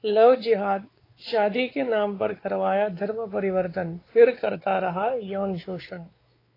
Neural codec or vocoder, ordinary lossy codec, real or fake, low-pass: codec, 16 kHz, 4 kbps, X-Codec, WavLM features, trained on Multilingual LibriSpeech; AAC, 24 kbps; fake; 5.4 kHz